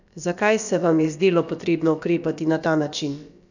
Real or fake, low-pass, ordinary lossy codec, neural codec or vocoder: fake; 7.2 kHz; none; codec, 16 kHz, about 1 kbps, DyCAST, with the encoder's durations